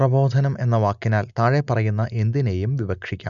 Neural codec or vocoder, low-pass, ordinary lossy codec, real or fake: none; 7.2 kHz; none; real